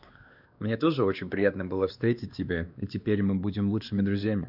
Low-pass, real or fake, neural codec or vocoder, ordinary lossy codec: 5.4 kHz; fake; codec, 16 kHz, 2 kbps, X-Codec, WavLM features, trained on Multilingual LibriSpeech; none